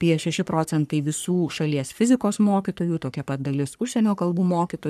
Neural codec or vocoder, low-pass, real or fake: codec, 44.1 kHz, 3.4 kbps, Pupu-Codec; 14.4 kHz; fake